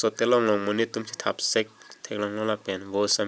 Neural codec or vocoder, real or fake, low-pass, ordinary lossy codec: none; real; none; none